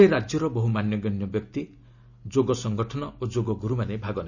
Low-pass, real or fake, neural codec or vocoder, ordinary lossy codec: 7.2 kHz; real; none; none